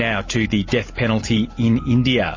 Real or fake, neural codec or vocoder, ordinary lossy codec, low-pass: real; none; MP3, 32 kbps; 7.2 kHz